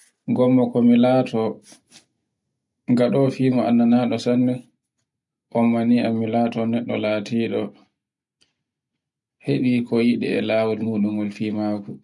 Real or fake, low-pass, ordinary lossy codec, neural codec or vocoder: real; 10.8 kHz; none; none